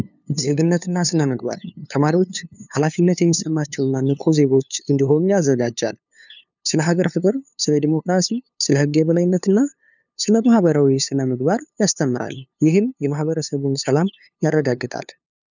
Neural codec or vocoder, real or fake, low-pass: codec, 16 kHz, 2 kbps, FunCodec, trained on LibriTTS, 25 frames a second; fake; 7.2 kHz